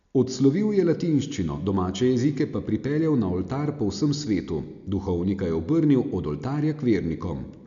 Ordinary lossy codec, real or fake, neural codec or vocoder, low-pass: none; real; none; 7.2 kHz